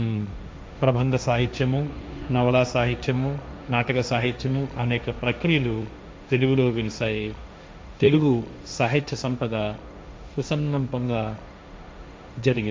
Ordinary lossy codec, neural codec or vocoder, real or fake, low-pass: none; codec, 16 kHz, 1.1 kbps, Voila-Tokenizer; fake; none